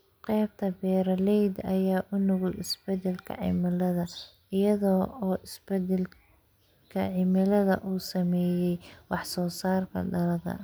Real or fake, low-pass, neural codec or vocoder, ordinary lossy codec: real; none; none; none